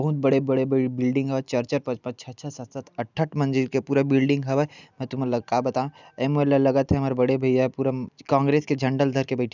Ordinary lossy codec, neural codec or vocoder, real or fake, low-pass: none; none; real; 7.2 kHz